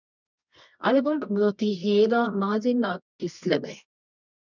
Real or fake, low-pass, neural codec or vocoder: fake; 7.2 kHz; codec, 24 kHz, 0.9 kbps, WavTokenizer, medium music audio release